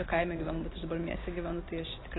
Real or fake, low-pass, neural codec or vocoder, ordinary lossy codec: real; 7.2 kHz; none; AAC, 16 kbps